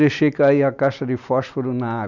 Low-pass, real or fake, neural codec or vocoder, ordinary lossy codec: 7.2 kHz; real; none; none